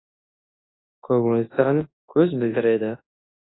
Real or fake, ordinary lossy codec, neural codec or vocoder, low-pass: fake; AAC, 16 kbps; codec, 24 kHz, 0.9 kbps, WavTokenizer, large speech release; 7.2 kHz